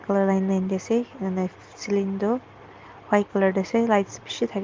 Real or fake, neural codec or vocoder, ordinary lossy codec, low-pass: real; none; Opus, 32 kbps; 7.2 kHz